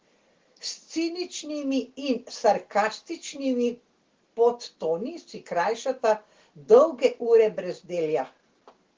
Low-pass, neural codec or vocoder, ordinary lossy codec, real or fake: 7.2 kHz; none; Opus, 16 kbps; real